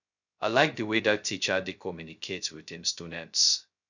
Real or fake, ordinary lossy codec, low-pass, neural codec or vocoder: fake; none; 7.2 kHz; codec, 16 kHz, 0.2 kbps, FocalCodec